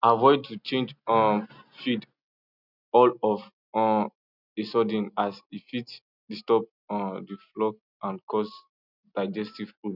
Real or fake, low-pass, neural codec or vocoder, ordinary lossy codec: real; 5.4 kHz; none; none